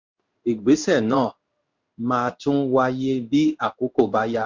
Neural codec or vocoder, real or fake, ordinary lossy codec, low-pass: codec, 16 kHz in and 24 kHz out, 1 kbps, XY-Tokenizer; fake; MP3, 64 kbps; 7.2 kHz